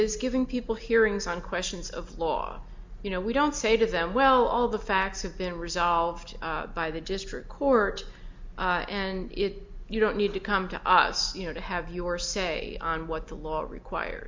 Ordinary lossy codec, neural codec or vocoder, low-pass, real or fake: MP3, 64 kbps; none; 7.2 kHz; real